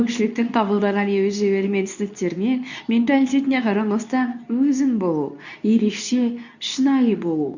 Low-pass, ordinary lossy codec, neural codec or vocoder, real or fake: 7.2 kHz; none; codec, 24 kHz, 0.9 kbps, WavTokenizer, medium speech release version 2; fake